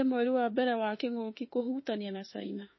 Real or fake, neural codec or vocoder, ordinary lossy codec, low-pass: fake; autoencoder, 48 kHz, 32 numbers a frame, DAC-VAE, trained on Japanese speech; MP3, 24 kbps; 7.2 kHz